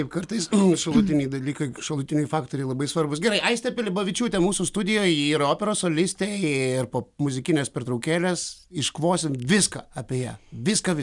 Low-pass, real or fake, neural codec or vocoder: 10.8 kHz; real; none